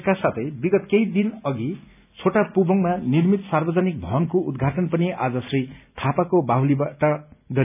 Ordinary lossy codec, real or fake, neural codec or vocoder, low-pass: none; real; none; 3.6 kHz